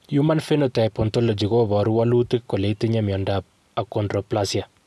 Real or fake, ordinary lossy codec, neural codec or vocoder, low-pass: real; none; none; none